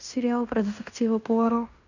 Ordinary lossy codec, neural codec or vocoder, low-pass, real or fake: none; codec, 16 kHz in and 24 kHz out, 0.9 kbps, LongCat-Audio-Codec, fine tuned four codebook decoder; 7.2 kHz; fake